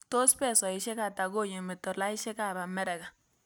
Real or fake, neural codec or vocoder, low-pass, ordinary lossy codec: real; none; none; none